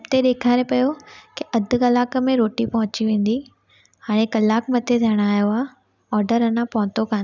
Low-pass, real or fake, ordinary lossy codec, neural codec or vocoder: 7.2 kHz; real; none; none